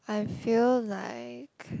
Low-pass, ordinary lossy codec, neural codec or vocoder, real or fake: none; none; none; real